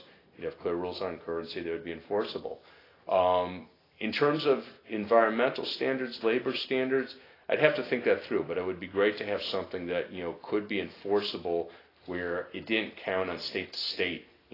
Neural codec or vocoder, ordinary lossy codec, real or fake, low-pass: none; AAC, 24 kbps; real; 5.4 kHz